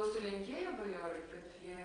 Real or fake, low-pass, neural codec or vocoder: fake; 9.9 kHz; vocoder, 22.05 kHz, 80 mel bands, WaveNeXt